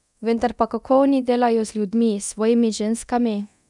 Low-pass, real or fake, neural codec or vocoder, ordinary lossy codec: 10.8 kHz; fake; codec, 24 kHz, 0.9 kbps, DualCodec; none